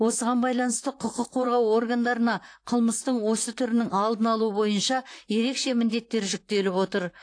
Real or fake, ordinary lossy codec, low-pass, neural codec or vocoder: fake; AAC, 48 kbps; 9.9 kHz; vocoder, 44.1 kHz, 128 mel bands, Pupu-Vocoder